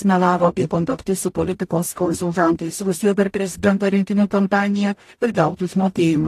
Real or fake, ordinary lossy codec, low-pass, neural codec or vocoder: fake; AAC, 64 kbps; 14.4 kHz; codec, 44.1 kHz, 0.9 kbps, DAC